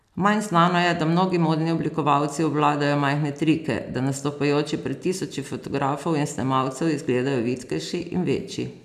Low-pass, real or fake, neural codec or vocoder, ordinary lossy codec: 14.4 kHz; real; none; none